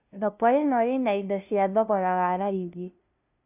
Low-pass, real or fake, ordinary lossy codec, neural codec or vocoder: 3.6 kHz; fake; none; codec, 16 kHz, 0.5 kbps, FunCodec, trained on LibriTTS, 25 frames a second